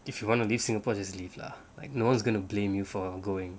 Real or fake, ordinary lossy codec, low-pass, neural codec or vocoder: real; none; none; none